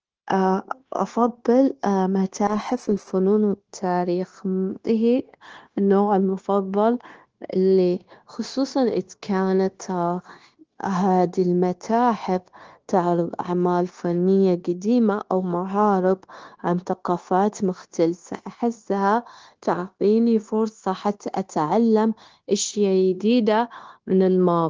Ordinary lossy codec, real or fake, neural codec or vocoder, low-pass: Opus, 16 kbps; fake; codec, 16 kHz, 0.9 kbps, LongCat-Audio-Codec; 7.2 kHz